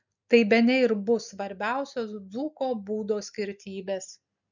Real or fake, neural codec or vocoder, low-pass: real; none; 7.2 kHz